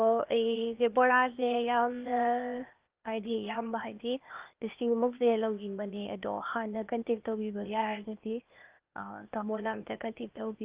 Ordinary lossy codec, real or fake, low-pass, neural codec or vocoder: Opus, 24 kbps; fake; 3.6 kHz; codec, 16 kHz, 0.8 kbps, ZipCodec